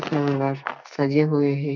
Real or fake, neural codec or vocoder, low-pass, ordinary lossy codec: fake; codec, 44.1 kHz, 2.6 kbps, SNAC; 7.2 kHz; MP3, 64 kbps